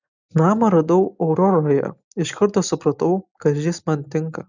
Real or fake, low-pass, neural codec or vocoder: real; 7.2 kHz; none